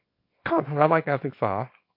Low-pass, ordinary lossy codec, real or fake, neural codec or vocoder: 5.4 kHz; MP3, 32 kbps; fake; codec, 24 kHz, 0.9 kbps, WavTokenizer, small release